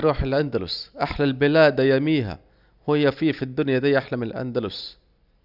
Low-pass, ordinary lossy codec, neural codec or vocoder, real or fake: 5.4 kHz; none; none; real